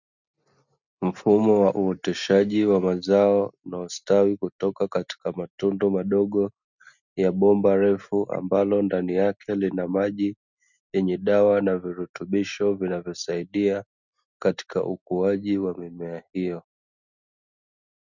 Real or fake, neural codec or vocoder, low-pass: real; none; 7.2 kHz